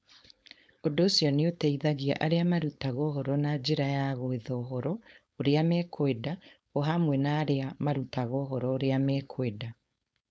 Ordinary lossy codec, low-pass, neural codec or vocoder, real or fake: none; none; codec, 16 kHz, 4.8 kbps, FACodec; fake